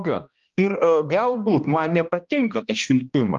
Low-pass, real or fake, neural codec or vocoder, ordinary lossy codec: 7.2 kHz; fake; codec, 16 kHz, 1 kbps, X-Codec, HuBERT features, trained on balanced general audio; Opus, 32 kbps